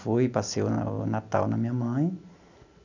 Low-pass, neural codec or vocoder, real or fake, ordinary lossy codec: 7.2 kHz; none; real; none